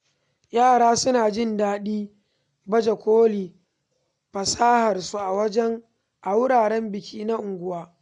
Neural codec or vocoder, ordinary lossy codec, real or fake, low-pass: none; none; real; 10.8 kHz